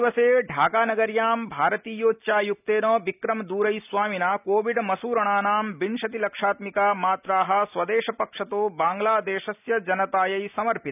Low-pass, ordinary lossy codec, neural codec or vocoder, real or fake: 3.6 kHz; none; none; real